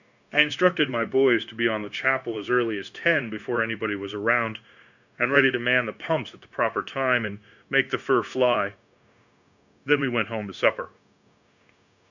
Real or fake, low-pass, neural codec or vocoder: fake; 7.2 kHz; codec, 16 kHz, 0.9 kbps, LongCat-Audio-Codec